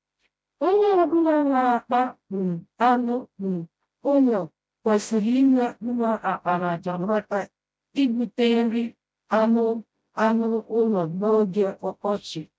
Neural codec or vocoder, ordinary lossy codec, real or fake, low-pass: codec, 16 kHz, 0.5 kbps, FreqCodec, smaller model; none; fake; none